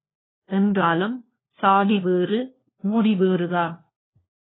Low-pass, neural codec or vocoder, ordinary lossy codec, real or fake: 7.2 kHz; codec, 16 kHz, 1 kbps, FunCodec, trained on LibriTTS, 50 frames a second; AAC, 16 kbps; fake